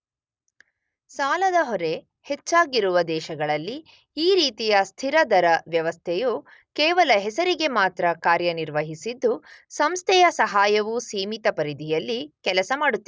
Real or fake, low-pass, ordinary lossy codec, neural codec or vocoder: real; none; none; none